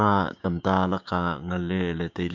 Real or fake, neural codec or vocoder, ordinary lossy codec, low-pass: fake; codec, 16 kHz, 6 kbps, DAC; MP3, 64 kbps; 7.2 kHz